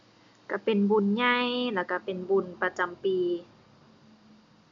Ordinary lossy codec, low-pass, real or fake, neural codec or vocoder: MP3, 64 kbps; 7.2 kHz; real; none